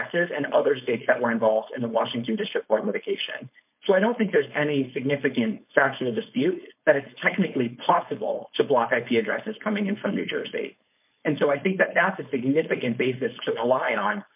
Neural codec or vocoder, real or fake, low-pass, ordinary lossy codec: codec, 16 kHz, 4.8 kbps, FACodec; fake; 3.6 kHz; MP3, 32 kbps